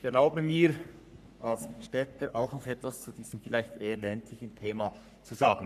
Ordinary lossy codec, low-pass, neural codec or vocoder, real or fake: none; 14.4 kHz; codec, 44.1 kHz, 3.4 kbps, Pupu-Codec; fake